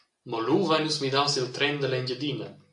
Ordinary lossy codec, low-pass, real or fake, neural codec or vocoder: AAC, 64 kbps; 10.8 kHz; real; none